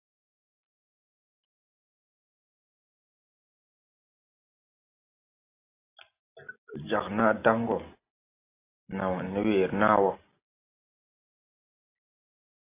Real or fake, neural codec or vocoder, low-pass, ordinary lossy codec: real; none; 3.6 kHz; AAC, 24 kbps